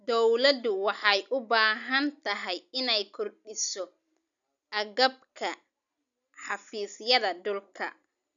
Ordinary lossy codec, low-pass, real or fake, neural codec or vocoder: none; 7.2 kHz; real; none